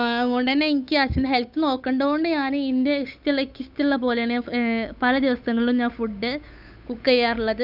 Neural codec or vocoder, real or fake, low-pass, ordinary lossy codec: codec, 16 kHz, 8 kbps, FunCodec, trained on Chinese and English, 25 frames a second; fake; 5.4 kHz; none